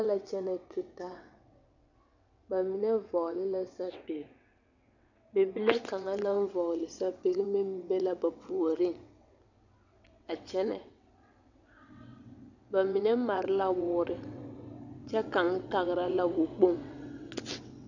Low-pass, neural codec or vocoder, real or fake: 7.2 kHz; vocoder, 22.05 kHz, 80 mel bands, WaveNeXt; fake